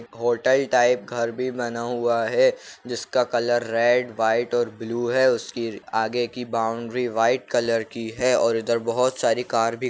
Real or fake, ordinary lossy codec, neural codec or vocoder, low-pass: real; none; none; none